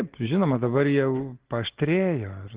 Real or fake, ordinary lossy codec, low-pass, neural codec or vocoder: real; Opus, 16 kbps; 3.6 kHz; none